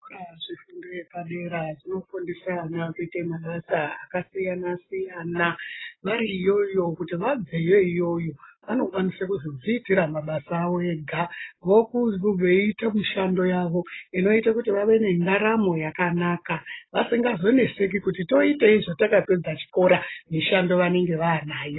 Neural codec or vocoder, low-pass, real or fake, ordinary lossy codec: none; 7.2 kHz; real; AAC, 16 kbps